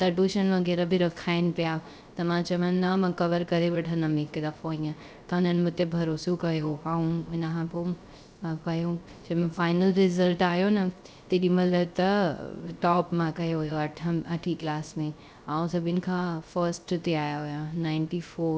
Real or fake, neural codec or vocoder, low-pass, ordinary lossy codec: fake; codec, 16 kHz, 0.3 kbps, FocalCodec; none; none